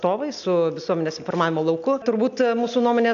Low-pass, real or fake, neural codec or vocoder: 7.2 kHz; real; none